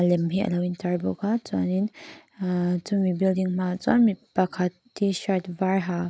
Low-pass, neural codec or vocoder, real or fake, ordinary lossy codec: none; none; real; none